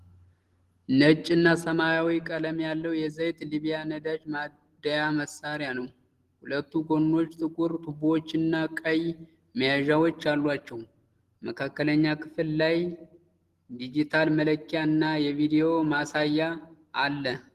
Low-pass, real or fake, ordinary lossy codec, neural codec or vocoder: 14.4 kHz; real; Opus, 16 kbps; none